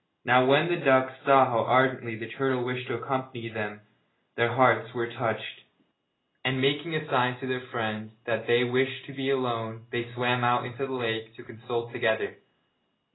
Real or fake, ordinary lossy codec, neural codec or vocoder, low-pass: real; AAC, 16 kbps; none; 7.2 kHz